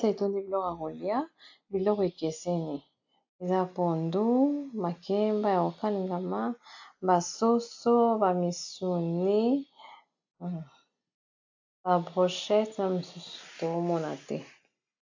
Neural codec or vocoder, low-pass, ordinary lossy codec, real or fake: none; 7.2 kHz; AAC, 48 kbps; real